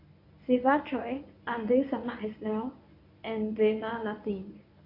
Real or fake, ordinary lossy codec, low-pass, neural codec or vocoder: fake; AAC, 32 kbps; 5.4 kHz; codec, 24 kHz, 0.9 kbps, WavTokenizer, medium speech release version 1